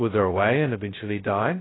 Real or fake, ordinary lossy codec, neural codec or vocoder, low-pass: fake; AAC, 16 kbps; codec, 16 kHz, 0.2 kbps, FocalCodec; 7.2 kHz